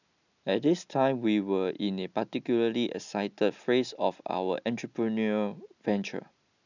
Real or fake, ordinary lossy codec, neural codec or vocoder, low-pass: real; none; none; 7.2 kHz